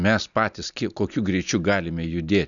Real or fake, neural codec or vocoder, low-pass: real; none; 7.2 kHz